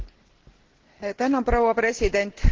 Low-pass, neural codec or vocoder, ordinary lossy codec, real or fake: 7.2 kHz; none; Opus, 16 kbps; real